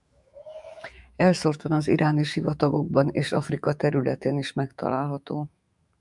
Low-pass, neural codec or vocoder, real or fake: 10.8 kHz; autoencoder, 48 kHz, 128 numbers a frame, DAC-VAE, trained on Japanese speech; fake